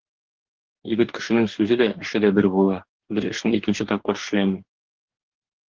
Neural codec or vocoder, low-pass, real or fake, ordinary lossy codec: codec, 44.1 kHz, 2.6 kbps, DAC; 7.2 kHz; fake; Opus, 16 kbps